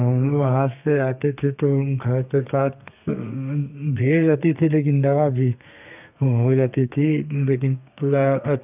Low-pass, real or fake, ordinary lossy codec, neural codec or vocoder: 3.6 kHz; fake; none; codec, 32 kHz, 1.9 kbps, SNAC